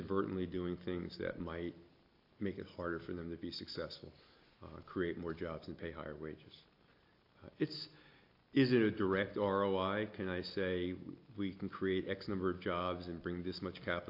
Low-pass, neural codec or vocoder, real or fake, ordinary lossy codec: 5.4 kHz; none; real; AAC, 32 kbps